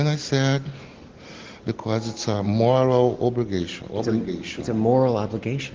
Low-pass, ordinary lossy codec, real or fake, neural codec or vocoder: 7.2 kHz; Opus, 16 kbps; real; none